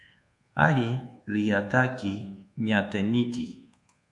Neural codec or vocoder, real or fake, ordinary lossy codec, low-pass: codec, 24 kHz, 1.2 kbps, DualCodec; fake; MP3, 64 kbps; 10.8 kHz